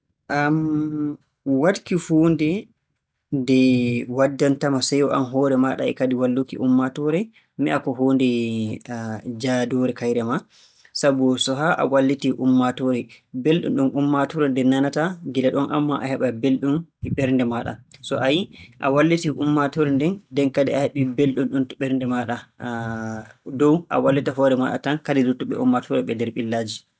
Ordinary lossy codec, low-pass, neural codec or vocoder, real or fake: none; none; none; real